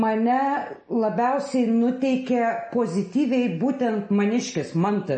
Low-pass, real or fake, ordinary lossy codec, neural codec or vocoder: 10.8 kHz; real; MP3, 32 kbps; none